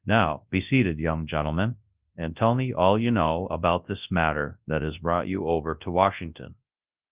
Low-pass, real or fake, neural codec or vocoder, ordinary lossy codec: 3.6 kHz; fake; codec, 24 kHz, 0.9 kbps, WavTokenizer, large speech release; Opus, 32 kbps